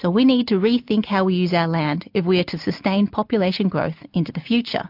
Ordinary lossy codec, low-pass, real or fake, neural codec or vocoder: MP3, 48 kbps; 5.4 kHz; real; none